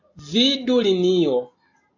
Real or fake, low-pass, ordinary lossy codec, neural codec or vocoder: real; 7.2 kHz; AAC, 48 kbps; none